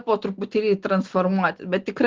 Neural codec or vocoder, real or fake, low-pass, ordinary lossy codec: none; real; 7.2 kHz; Opus, 16 kbps